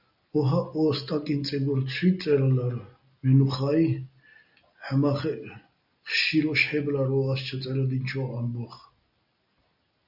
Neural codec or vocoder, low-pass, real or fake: none; 5.4 kHz; real